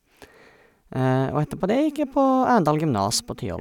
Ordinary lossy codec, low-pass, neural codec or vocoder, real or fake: none; 19.8 kHz; none; real